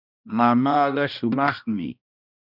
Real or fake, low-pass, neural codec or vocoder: fake; 5.4 kHz; codec, 16 kHz, 1 kbps, X-Codec, HuBERT features, trained on balanced general audio